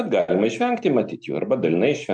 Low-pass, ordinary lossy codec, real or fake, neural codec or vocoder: 9.9 kHz; MP3, 64 kbps; real; none